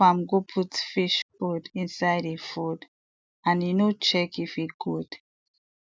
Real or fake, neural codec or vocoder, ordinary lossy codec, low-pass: real; none; none; none